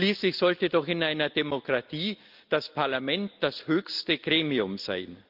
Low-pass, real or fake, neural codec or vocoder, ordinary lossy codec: 5.4 kHz; real; none; Opus, 24 kbps